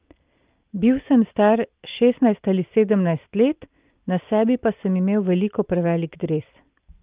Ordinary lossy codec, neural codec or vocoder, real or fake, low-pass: Opus, 32 kbps; none; real; 3.6 kHz